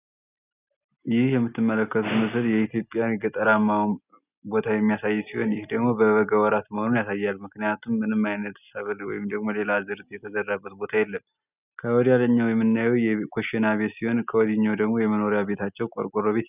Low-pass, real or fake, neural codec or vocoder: 3.6 kHz; real; none